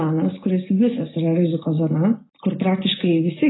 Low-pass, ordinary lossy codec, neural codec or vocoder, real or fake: 7.2 kHz; AAC, 16 kbps; none; real